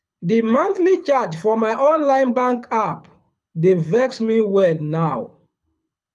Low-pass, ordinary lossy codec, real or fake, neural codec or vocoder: none; none; fake; codec, 24 kHz, 6 kbps, HILCodec